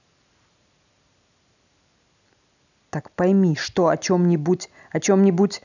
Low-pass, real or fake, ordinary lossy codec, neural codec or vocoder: 7.2 kHz; real; none; none